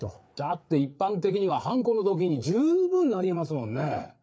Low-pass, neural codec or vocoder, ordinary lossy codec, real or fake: none; codec, 16 kHz, 4 kbps, FreqCodec, larger model; none; fake